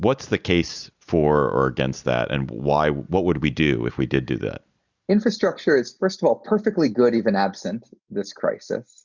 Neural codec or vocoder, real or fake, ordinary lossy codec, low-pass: none; real; Opus, 64 kbps; 7.2 kHz